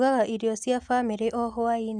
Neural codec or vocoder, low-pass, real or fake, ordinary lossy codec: none; 9.9 kHz; real; Opus, 64 kbps